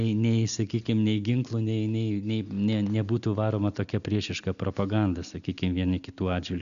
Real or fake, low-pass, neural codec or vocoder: real; 7.2 kHz; none